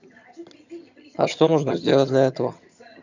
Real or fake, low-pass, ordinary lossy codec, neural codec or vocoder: fake; 7.2 kHz; none; vocoder, 22.05 kHz, 80 mel bands, HiFi-GAN